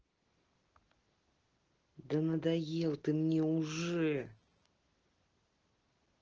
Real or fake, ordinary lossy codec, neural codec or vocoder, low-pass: real; Opus, 16 kbps; none; 7.2 kHz